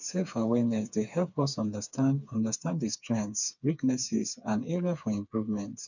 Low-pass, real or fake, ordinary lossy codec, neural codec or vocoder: 7.2 kHz; fake; none; codec, 16 kHz, 4 kbps, FreqCodec, smaller model